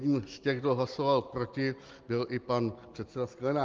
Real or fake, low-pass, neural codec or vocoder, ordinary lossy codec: real; 7.2 kHz; none; Opus, 32 kbps